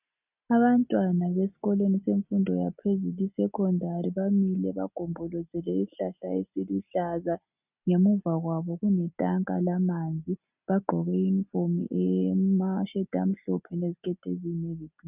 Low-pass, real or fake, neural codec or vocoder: 3.6 kHz; real; none